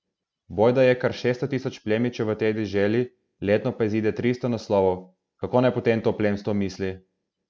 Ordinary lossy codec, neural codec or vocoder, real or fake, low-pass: none; none; real; none